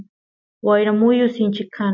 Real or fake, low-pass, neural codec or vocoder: real; 7.2 kHz; none